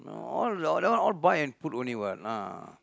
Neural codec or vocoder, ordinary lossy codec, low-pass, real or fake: none; none; none; real